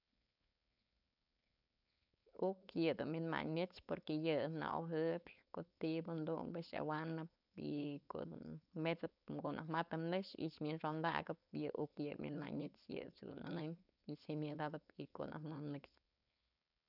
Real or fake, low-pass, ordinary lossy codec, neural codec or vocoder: fake; 5.4 kHz; none; codec, 16 kHz, 4.8 kbps, FACodec